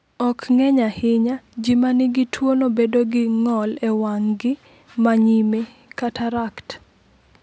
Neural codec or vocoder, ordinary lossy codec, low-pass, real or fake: none; none; none; real